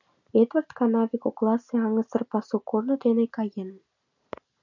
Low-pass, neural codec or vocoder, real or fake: 7.2 kHz; none; real